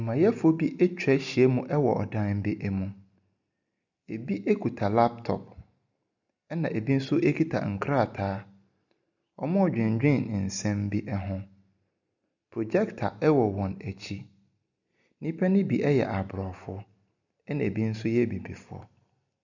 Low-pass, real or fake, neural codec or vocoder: 7.2 kHz; real; none